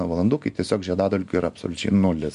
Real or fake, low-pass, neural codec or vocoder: real; 10.8 kHz; none